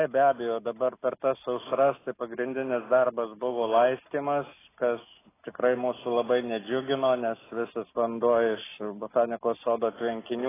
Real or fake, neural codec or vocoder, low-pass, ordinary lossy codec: real; none; 3.6 kHz; AAC, 16 kbps